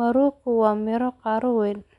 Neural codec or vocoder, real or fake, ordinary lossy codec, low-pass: none; real; none; 10.8 kHz